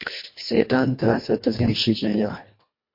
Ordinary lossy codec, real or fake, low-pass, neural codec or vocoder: MP3, 32 kbps; fake; 5.4 kHz; codec, 24 kHz, 1.5 kbps, HILCodec